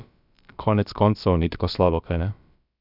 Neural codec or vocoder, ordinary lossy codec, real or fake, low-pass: codec, 16 kHz, about 1 kbps, DyCAST, with the encoder's durations; none; fake; 5.4 kHz